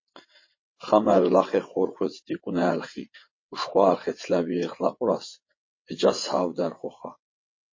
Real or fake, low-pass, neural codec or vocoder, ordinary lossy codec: fake; 7.2 kHz; vocoder, 22.05 kHz, 80 mel bands, WaveNeXt; MP3, 32 kbps